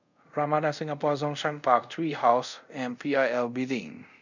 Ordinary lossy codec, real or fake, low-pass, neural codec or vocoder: none; fake; 7.2 kHz; codec, 24 kHz, 0.5 kbps, DualCodec